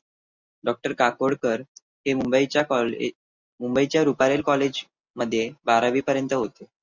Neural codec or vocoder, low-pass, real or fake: none; 7.2 kHz; real